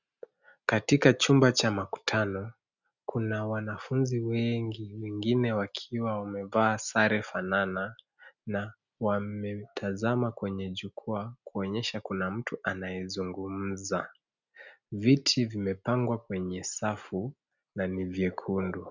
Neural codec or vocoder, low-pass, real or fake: none; 7.2 kHz; real